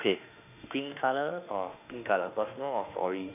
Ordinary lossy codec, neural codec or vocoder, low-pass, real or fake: none; autoencoder, 48 kHz, 32 numbers a frame, DAC-VAE, trained on Japanese speech; 3.6 kHz; fake